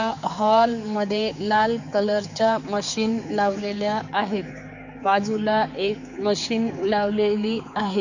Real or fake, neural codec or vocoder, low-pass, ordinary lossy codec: fake; codec, 16 kHz, 4 kbps, X-Codec, HuBERT features, trained on general audio; 7.2 kHz; none